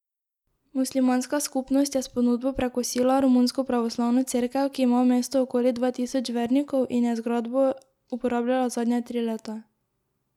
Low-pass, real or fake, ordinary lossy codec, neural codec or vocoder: 19.8 kHz; real; none; none